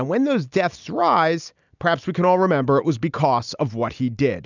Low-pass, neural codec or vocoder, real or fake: 7.2 kHz; none; real